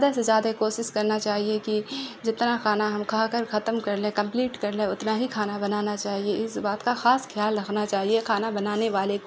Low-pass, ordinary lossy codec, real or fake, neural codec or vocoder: none; none; real; none